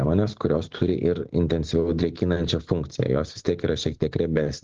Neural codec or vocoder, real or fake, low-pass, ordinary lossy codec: none; real; 7.2 kHz; Opus, 16 kbps